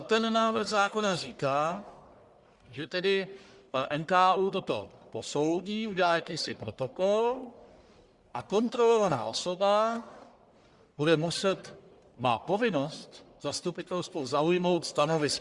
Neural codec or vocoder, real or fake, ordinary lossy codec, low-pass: codec, 44.1 kHz, 1.7 kbps, Pupu-Codec; fake; Opus, 64 kbps; 10.8 kHz